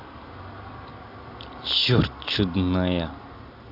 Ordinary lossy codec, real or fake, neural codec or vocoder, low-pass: none; real; none; 5.4 kHz